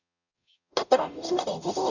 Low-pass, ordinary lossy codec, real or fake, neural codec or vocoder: 7.2 kHz; none; fake; codec, 44.1 kHz, 0.9 kbps, DAC